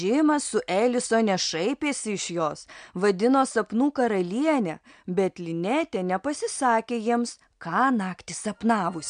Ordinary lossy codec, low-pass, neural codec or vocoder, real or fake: MP3, 64 kbps; 9.9 kHz; none; real